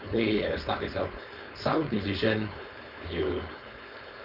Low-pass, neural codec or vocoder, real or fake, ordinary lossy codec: 5.4 kHz; codec, 16 kHz, 4.8 kbps, FACodec; fake; none